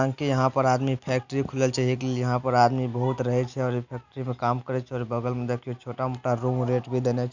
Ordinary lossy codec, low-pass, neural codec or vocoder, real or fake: none; 7.2 kHz; none; real